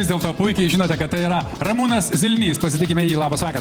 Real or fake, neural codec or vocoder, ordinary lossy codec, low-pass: fake; vocoder, 48 kHz, 128 mel bands, Vocos; Opus, 24 kbps; 19.8 kHz